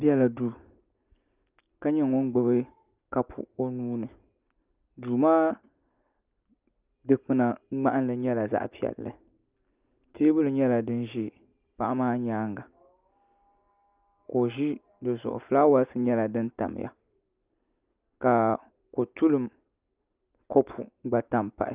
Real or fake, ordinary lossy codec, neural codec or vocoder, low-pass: real; Opus, 24 kbps; none; 3.6 kHz